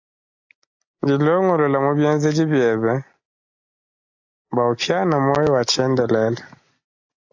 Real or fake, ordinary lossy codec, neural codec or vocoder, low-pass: real; AAC, 48 kbps; none; 7.2 kHz